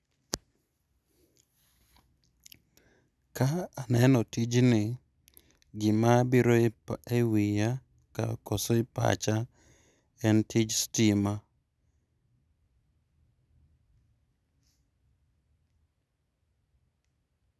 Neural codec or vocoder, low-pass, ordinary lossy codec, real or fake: none; none; none; real